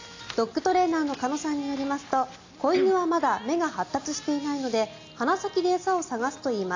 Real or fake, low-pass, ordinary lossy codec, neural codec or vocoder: real; 7.2 kHz; AAC, 48 kbps; none